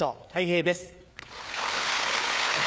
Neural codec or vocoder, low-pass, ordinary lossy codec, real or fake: codec, 16 kHz, 4 kbps, FreqCodec, larger model; none; none; fake